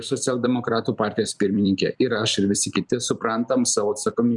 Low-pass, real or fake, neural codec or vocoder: 10.8 kHz; fake; vocoder, 44.1 kHz, 128 mel bands every 256 samples, BigVGAN v2